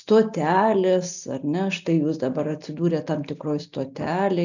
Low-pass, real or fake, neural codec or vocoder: 7.2 kHz; real; none